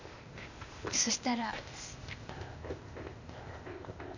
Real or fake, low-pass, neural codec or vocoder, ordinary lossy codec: fake; 7.2 kHz; codec, 16 kHz, 0.8 kbps, ZipCodec; none